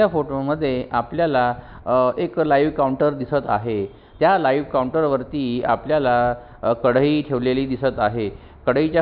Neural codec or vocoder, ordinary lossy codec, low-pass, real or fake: none; none; 5.4 kHz; real